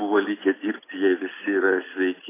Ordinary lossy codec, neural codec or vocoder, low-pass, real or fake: AAC, 16 kbps; none; 3.6 kHz; real